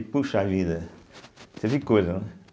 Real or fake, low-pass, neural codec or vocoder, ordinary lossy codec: real; none; none; none